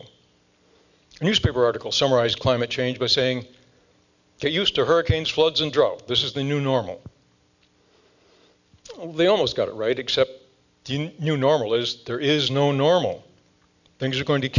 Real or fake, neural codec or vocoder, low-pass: real; none; 7.2 kHz